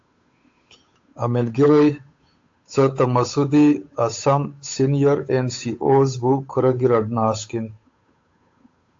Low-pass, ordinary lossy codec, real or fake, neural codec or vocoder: 7.2 kHz; AAC, 48 kbps; fake; codec, 16 kHz, 8 kbps, FunCodec, trained on Chinese and English, 25 frames a second